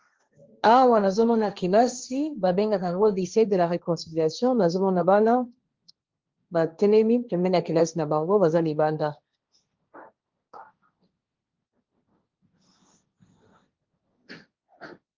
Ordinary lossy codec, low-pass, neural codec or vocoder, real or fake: Opus, 32 kbps; 7.2 kHz; codec, 16 kHz, 1.1 kbps, Voila-Tokenizer; fake